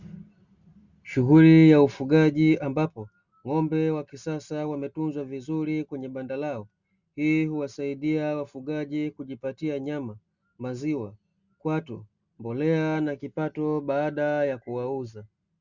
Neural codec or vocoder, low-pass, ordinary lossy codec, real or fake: none; 7.2 kHz; Opus, 64 kbps; real